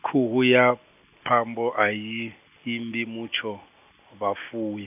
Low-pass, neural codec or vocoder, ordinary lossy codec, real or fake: 3.6 kHz; none; none; real